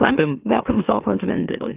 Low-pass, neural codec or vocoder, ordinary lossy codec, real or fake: 3.6 kHz; autoencoder, 44.1 kHz, a latent of 192 numbers a frame, MeloTTS; Opus, 24 kbps; fake